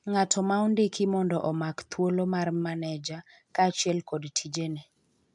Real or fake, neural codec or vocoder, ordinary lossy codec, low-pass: real; none; none; 10.8 kHz